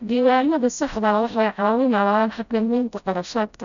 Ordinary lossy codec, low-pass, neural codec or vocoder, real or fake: none; 7.2 kHz; codec, 16 kHz, 0.5 kbps, FreqCodec, smaller model; fake